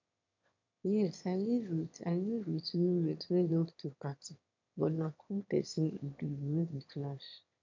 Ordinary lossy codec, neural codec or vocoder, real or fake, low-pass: MP3, 64 kbps; autoencoder, 22.05 kHz, a latent of 192 numbers a frame, VITS, trained on one speaker; fake; 7.2 kHz